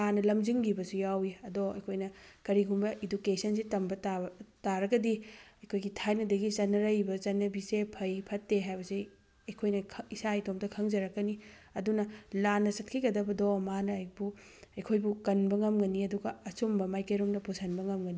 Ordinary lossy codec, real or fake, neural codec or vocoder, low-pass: none; real; none; none